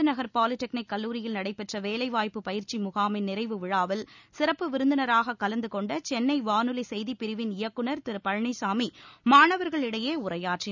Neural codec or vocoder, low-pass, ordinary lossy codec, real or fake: none; 7.2 kHz; none; real